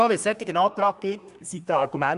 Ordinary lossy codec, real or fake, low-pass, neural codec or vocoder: none; fake; 10.8 kHz; codec, 24 kHz, 1 kbps, SNAC